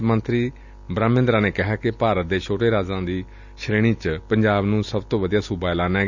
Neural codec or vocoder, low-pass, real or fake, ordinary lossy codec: none; 7.2 kHz; real; none